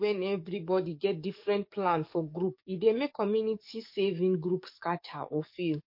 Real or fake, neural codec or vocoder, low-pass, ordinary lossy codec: fake; codec, 44.1 kHz, 7.8 kbps, DAC; 5.4 kHz; MP3, 32 kbps